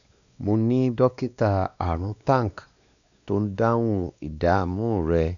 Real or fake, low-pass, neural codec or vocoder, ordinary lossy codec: fake; 7.2 kHz; codec, 16 kHz, 2 kbps, X-Codec, WavLM features, trained on Multilingual LibriSpeech; none